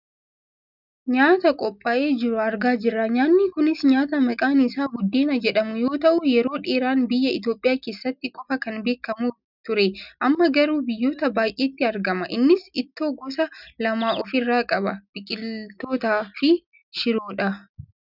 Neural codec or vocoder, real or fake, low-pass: none; real; 5.4 kHz